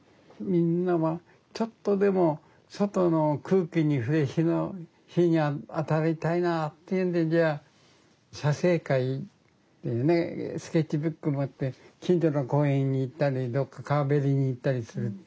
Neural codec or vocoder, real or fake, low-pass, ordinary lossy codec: none; real; none; none